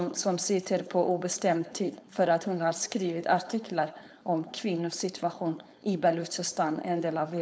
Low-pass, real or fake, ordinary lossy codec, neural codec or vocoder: none; fake; none; codec, 16 kHz, 4.8 kbps, FACodec